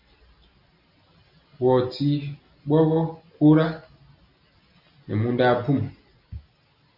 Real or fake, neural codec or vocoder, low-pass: real; none; 5.4 kHz